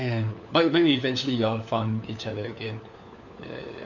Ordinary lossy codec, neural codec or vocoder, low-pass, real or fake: none; codec, 16 kHz, 4 kbps, FunCodec, trained on LibriTTS, 50 frames a second; 7.2 kHz; fake